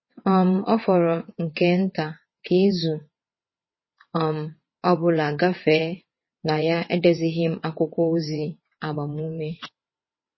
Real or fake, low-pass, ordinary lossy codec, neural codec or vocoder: fake; 7.2 kHz; MP3, 24 kbps; vocoder, 22.05 kHz, 80 mel bands, WaveNeXt